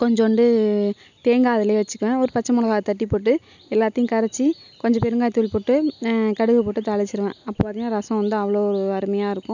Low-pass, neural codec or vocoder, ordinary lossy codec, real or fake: 7.2 kHz; none; none; real